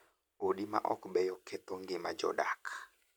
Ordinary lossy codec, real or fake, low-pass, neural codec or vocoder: none; real; none; none